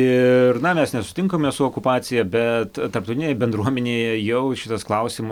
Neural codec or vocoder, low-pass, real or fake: none; 19.8 kHz; real